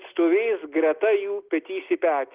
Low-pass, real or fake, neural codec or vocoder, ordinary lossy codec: 3.6 kHz; real; none; Opus, 32 kbps